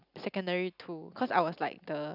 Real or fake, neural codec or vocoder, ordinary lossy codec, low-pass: real; none; none; 5.4 kHz